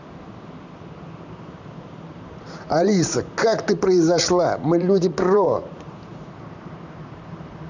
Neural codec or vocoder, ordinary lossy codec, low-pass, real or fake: vocoder, 44.1 kHz, 128 mel bands, Pupu-Vocoder; none; 7.2 kHz; fake